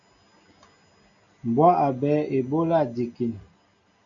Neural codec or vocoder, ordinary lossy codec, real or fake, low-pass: none; AAC, 48 kbps; real; 7.2 kHz